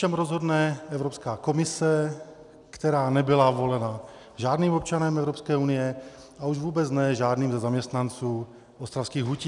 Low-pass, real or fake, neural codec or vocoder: 10.8 kHz; real; none